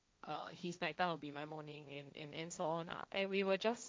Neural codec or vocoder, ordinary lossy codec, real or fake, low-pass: codec, 16 kHz, 1.1 kbps, Voila-Tokenizer; none; fake; none